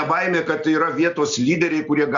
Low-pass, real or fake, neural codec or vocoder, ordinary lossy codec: 7.2 kHz; real; none; Opus, 64 kbps